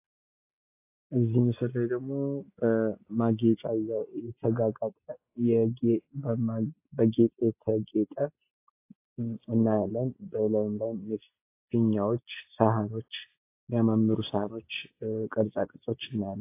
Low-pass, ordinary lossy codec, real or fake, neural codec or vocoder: 3.6 kHz; AAC, 24 kbps; real; none